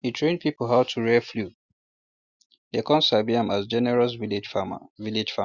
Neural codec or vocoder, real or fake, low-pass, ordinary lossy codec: none; real; 7.2 kHz; Opus, 64 kbps